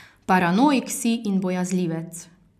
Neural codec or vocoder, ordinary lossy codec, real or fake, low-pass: none; none; real; 14.4 kHz